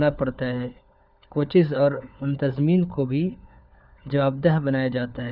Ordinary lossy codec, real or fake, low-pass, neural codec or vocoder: none; fake; 5.4 kHz; codec, 16 kHz, 4 kbps, FunCodec, trained on LibriTTS, 50 frames a second